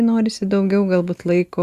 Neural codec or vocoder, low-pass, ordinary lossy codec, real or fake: none; 14.4 kHz; Opus, 64 kbps; real